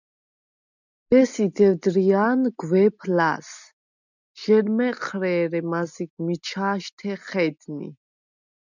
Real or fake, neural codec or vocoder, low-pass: real; none; 7.2 kHz